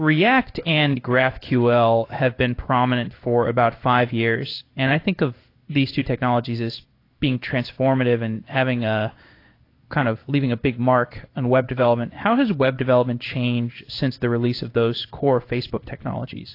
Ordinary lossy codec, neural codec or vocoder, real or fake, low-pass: AAC, 32 kbps; codec, 16 kHz in and 24 kHz out, 1 kbps, XY-Tokenizer; fake; 5.4 kHz